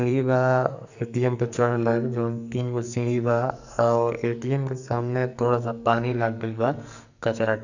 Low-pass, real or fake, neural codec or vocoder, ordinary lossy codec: 7.2 kHz; fake; codec, 44.1 kHz, 2.6 kbps, SNAC; none